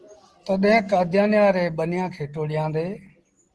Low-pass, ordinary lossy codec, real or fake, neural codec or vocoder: 10.8 kHz; Opus, 16 kbps; real; none